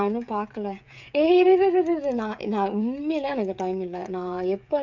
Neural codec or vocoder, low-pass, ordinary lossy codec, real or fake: codec, 16 kHz, 16 kbps, FreqCodec, smaller model; 7.2 kHz; none; fake